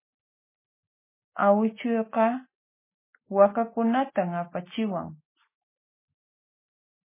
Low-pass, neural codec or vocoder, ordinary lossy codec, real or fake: 3.6 kHz; none; MP3, 16 kbps; real